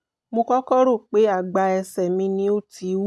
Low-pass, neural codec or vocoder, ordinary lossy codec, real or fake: none; none; none; real